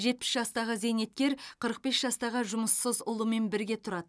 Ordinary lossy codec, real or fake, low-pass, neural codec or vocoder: none; real; none; none